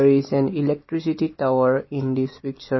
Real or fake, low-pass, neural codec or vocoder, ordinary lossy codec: real; 7.2 kHz; none; MP3, 24 kbps